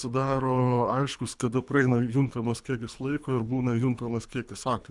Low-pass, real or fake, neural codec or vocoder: 10.8 kHz; fake; codec, 24 kHz, 3 kbps, HILCodec